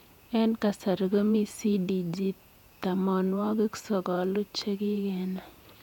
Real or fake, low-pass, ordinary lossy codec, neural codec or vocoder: fake; 19.8 kHz; none; vocoder, 48 kHz, 128 mel bands, Vocos